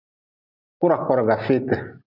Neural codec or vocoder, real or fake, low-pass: none; real; 5.4 kHz